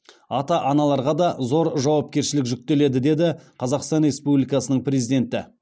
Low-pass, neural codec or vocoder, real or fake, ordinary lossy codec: none; none; real; none